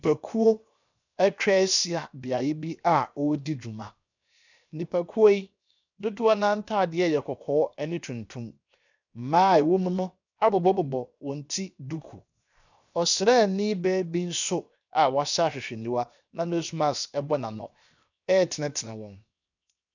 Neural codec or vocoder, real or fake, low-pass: codec, 16 kHz, 0.7 kbps, FocalCodec; fake; 7.2 kHz